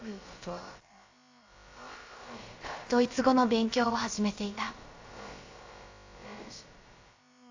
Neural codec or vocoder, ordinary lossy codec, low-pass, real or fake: codec, 16 kHz, about 1 kbps, DyCAST, with the encoder's durations; AAC, 48 kbps; 7.2 kHz; fake